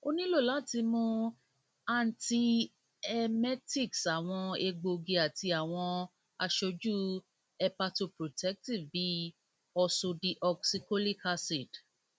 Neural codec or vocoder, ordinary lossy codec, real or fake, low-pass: none; none; real; none